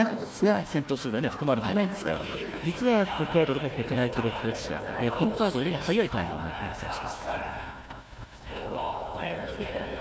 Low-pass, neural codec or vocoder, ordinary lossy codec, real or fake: none; codec, 16 kHz, 1 kbps, FunCodec, trained on Chinese and English, 50 frames a second; none; fake